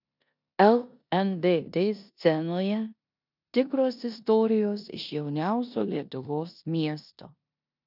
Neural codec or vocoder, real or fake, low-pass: codec, 16 kHz in and 24 kHz out, 0.9 kbps, LongCat-Audio-Codec, four codebook decoder; fake; 5.4 kHz